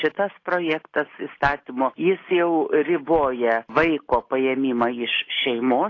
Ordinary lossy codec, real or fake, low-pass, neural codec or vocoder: AAC, 32 kbps; real; 7.2 kHz; none